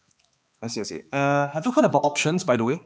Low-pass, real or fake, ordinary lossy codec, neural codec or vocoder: none; fake; none; codec, 16 kHz, 2 kbps, X-Codec, HuBERT features, trained on balanced general audio